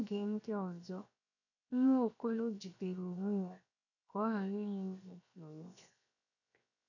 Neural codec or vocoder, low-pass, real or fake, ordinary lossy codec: codec, 16 kHz, 0.7 kbps, FocalCodec; 7.2 kHz; fake; none